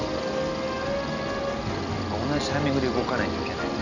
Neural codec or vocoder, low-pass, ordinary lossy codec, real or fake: none; 7.2 kHz; none; real